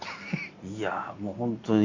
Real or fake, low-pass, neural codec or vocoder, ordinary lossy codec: real; 7.2 kHz; none; Opus, 64 kbps